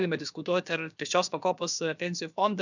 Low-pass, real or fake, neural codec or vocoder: 7.2 kHz; fake; codec, 16 kHz, about 1 kbps, DyCAST, with the encoder's durations